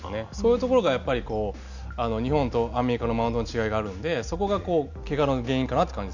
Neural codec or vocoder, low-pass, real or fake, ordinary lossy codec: none; 7.2 kHz; real; none